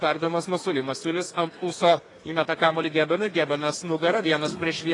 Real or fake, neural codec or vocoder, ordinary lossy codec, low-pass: fake; codec, 32 kHz, 1.9 kbps, SNAC; AAC, 32 kbps; 10.8 kHz